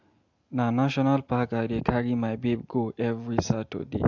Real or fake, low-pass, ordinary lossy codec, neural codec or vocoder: real; 7.2 kHz; AAC, 48 kbps; none